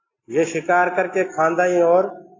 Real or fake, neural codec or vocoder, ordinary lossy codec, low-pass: real; none; AAC, 32 kbps; 7.2 kHz